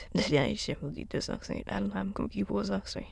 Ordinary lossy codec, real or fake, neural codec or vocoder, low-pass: none; fake; autoencoder, 22.05 kHz, a latent of 192 numbers a frame, VITS, trained on many speakers; none